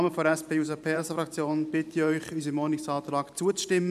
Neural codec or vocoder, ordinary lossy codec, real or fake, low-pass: vocoder, 44.1 kHz, 128 mel bands every 512 samples, BigVGAN v2; none; fake; 14.4 kHz